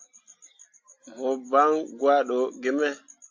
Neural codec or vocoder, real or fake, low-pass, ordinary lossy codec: none; real; 7.2 kHz; AAC, 48 kbps